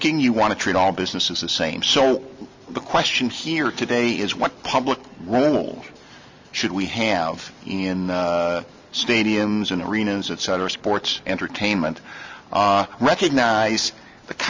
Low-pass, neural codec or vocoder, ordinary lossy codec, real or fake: 7.2 kHz; none; MP3, 48 kbps; real